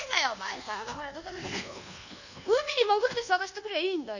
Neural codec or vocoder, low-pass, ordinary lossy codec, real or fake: codec, 24 kHz, 1.2 kbps, DualCodec; 7.2 kHz; none; fake